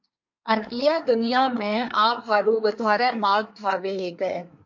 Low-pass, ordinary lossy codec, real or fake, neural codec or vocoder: 7.2 kHz; MP3, 48 kbps; fake; codec, 24 kHz, 1 kbps, SNAC